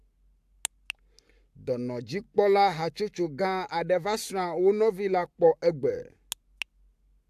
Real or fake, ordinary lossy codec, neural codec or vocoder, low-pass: fake; AAC, 96 kbps; codec, 44.1 kHz, 7.8 kbps, Pupu-Codec; 14.4 kHz